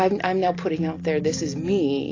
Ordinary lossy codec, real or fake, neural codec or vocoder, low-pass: AAC, 32 kbps; real; none; 7.2 kHz